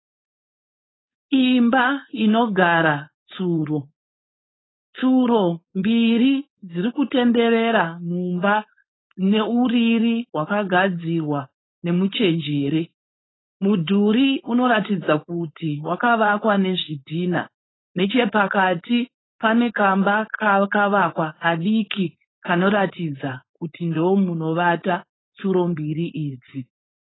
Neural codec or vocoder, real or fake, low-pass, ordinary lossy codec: codec, 16 kHz, 4.8 kbps, FACodec; fake; 7.2 kHz; AAC, 16 kbps